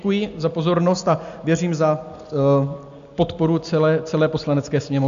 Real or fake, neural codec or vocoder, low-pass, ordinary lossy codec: real; none; 7.2 kHz; MP3, 64 kbps